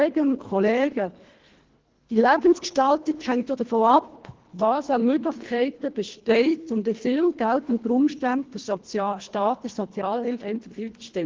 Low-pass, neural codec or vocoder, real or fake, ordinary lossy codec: 7.2 kHz; codec, 24 kHz, 1.5 kbps, HILCodec; fake; Opus, 16 kbps